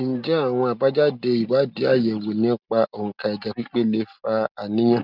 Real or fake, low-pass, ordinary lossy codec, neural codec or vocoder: real; 5.4 kHz; none; none